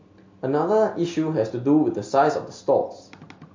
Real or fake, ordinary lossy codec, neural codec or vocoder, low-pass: real; MP3, 48 kbps; none; 7.2 kHz